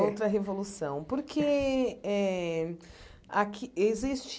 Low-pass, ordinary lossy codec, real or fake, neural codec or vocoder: none; none; real; none